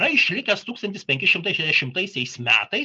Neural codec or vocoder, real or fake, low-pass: none; real; 10.8 kHz